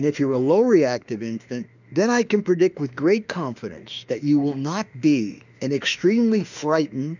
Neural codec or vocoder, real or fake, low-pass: autoencoder, 48 kHz, 32 numbers a frame, DAC-VAE, trained on Japanese speech; fake; 7.2 kHz